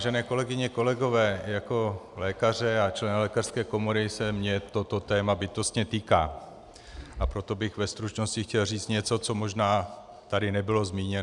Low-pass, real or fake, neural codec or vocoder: 10.8 kHz; real; none